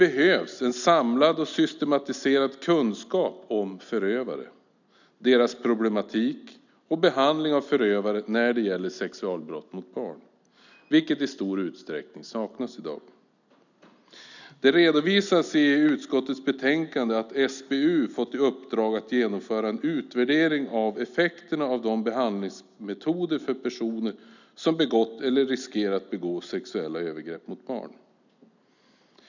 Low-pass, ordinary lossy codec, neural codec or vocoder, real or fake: 7.2 kHz; none; none; real